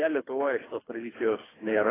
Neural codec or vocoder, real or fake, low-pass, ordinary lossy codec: codec, 24 kHz, 3 kbps, HILCodec; fake; 3.6 kHz; AAC, 16 kbps